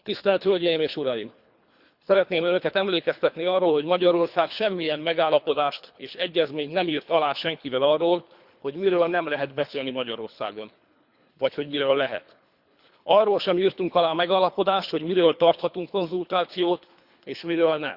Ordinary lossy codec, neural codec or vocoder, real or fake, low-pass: Opus, 64 kbps; codec, 24 kHz, 3 kbps, HILCodec; fake; 5.4 kHz